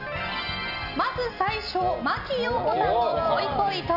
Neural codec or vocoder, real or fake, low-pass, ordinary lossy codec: none; real; 5.4 kHz; none